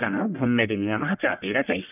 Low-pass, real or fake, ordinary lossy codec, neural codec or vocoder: 3.6 kHz; fake; none; codec, 44.1 kHz, 1.7 kbps, Pupu-Codec